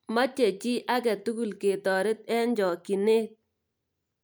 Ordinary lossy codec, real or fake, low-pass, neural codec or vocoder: none; real; none; none